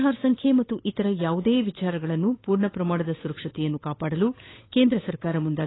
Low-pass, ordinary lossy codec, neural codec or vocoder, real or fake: 7.2 kHz; AAC, 16 kbps; codec, 16 kHz, 8 kbps, FunCodec, trained on Chinese and English, 25 frames a second; fake